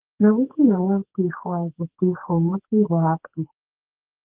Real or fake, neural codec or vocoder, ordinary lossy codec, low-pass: fake; codec, 44.1 kHz, 2.6 kbps, SNAC; Opus, 16 kbps; 3.6 kHz